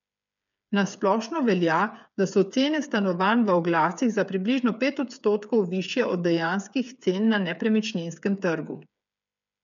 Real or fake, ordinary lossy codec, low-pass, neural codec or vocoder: fake; none; 7.2 kHz; codec, 16 kHz, 8 kbps, FreqCodec, smaller model